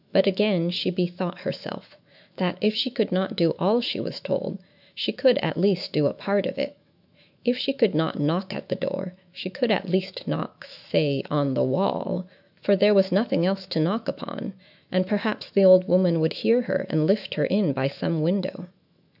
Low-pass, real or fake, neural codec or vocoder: 5.4 kHz; fake; autoencoder, 48 kHz, 128 numbers a frame, DAC-VAE, trained on Japanese speech